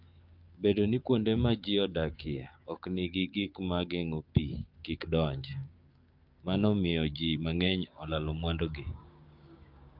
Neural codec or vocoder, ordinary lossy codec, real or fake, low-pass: codec, 16 kHz, 6 kbps, DAC; Opus, 24 kbps; fake; 5.4 kHz